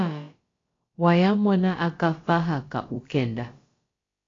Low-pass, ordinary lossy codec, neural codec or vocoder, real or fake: 7.2 kHz; AAC, 32 kbps; codec, 16 kHz, about 1 kbps, DyCAST, with the encoder's durations; fake